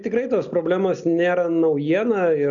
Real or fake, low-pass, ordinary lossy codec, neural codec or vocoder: real; 7.2 kHz; MP3, 96 kbps; none